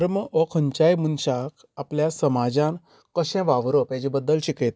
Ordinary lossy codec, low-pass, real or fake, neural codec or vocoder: none; none; real; none